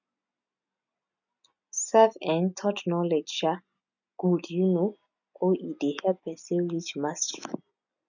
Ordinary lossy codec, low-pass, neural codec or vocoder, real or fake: none; 7.2 kHz; none; real